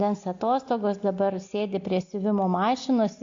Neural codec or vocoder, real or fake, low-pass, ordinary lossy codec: none; real; 7.2 kHz; AAC, 48 kbps